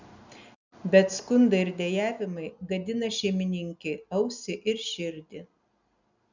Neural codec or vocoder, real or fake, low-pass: none; real; 7.2 kHz